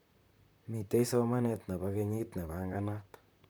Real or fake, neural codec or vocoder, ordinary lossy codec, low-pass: fake; vocoder, 44.1 kHz, 128 mel bands, Pupu-Vocoder; none; none